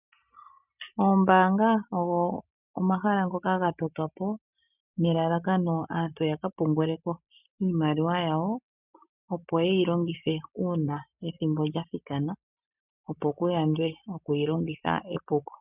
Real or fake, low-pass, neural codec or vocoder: real; 3.6 kHz; none